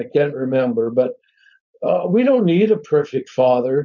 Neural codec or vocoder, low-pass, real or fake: codec, 16 kHz, 4.8 kbps, FACodec; 7.2 kHz; fake